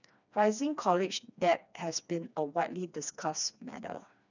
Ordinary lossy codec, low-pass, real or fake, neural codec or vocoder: none; 7.2 kHz; fake; codec, 16 kHz, 2 kbps, FreqCodec, smaller model